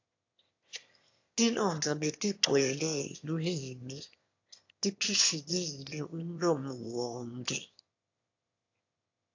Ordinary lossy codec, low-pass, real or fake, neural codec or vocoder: AAC, 48 kbps; 7.2 kHz; fake; autoencoder, 22.05 kHz, a latent of 192 numbers a frame, VITS, trained on one speaker